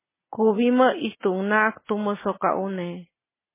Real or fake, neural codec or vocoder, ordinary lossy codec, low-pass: real; none; MP3, 16 kbps; 3.6 kHz